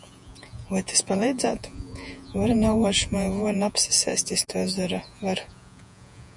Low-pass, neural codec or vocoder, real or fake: 10.8 kHz; vocoder, 48 kHz, 128 mel bands, Vocos; fake